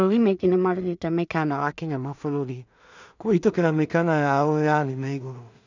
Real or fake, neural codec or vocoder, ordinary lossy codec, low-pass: fake; codec, 16 kHz in and 24 kHz out, 0.4 kbps, LongCat-Audio-Codec, two codebook decoder; none; 7.2 kHz